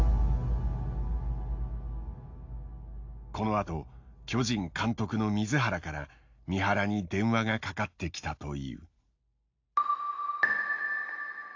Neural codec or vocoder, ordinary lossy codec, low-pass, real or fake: none; AAC, 48 kbps; 7.2 kHz; real